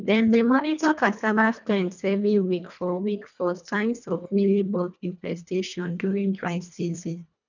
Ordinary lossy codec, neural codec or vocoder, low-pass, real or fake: none; codec, 24 kHz, 1.5 kbps, HILCodec; 7.2 kHz; fake